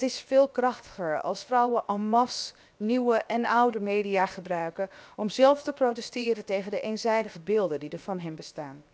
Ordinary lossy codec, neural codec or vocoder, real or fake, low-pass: none; codec, 16 kHz, 0.7 kbps, FocalCodec; fake; none